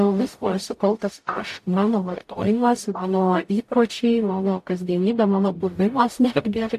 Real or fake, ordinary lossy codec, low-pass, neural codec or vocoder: fake; AAC, 64 kbps; 14.4 kHz; codec, 44.1 kHz, 0.9 kbps, DAC